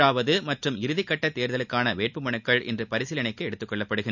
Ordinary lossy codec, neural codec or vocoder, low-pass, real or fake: none; none; 7.2 kHz; real